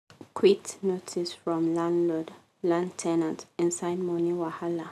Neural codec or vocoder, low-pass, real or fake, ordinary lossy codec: none; 14.4 kHz; real; none